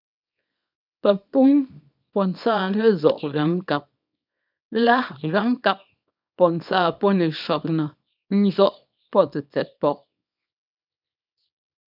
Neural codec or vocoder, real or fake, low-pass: codec, 24 kHz, 0.9 kbps, WavTokenizer, small release; fake; 5.4 kHz